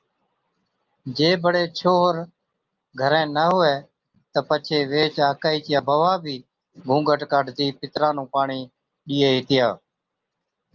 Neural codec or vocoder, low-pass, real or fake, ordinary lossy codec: none; 7.2 kHz; real; Opus, 24 kbps